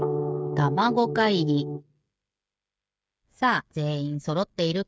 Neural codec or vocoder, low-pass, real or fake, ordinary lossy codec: codec, 16 kHz, 8 kbps, FreqCodec, smaller model; none; fake; none